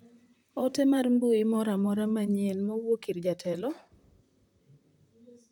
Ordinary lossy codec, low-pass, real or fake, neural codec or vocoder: none; 19.8 kHz; fake; vocoder, 44.1 kHz, 128 mel bands, Pupu-Vocoder